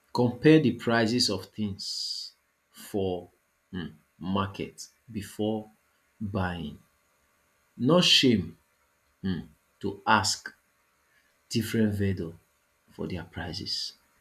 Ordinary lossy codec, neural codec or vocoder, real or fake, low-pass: none; none; real; 14.4 kHz